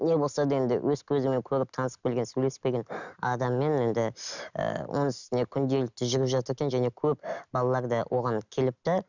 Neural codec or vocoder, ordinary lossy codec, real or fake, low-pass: none; none; real; 7.2 kHz